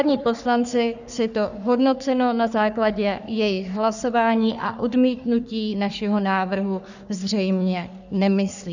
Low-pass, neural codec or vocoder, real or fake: 7.2 kHz; codec, 44.1 kHz, 3.4 kbps, Pupu-Codec; fake